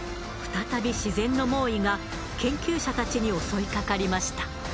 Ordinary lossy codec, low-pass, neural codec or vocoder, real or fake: none; none; none; real